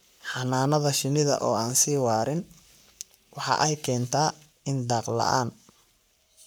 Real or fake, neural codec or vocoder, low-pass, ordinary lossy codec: fake; codec, 44.1 kHz, 7.8 kbps, Pupu-Codec; none; none